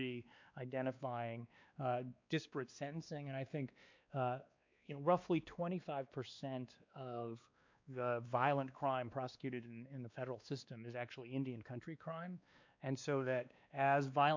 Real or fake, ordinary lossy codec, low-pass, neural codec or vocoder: fake; MP3, 64 kbps; 7.2 kHz; codec, 16 kHz, 2 kbps, X-Codec, WavLM features, trained on Multilingual LibriSpeech